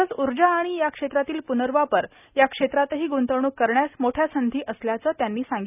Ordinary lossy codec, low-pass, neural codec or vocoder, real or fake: none; 3.6 kHz; none; real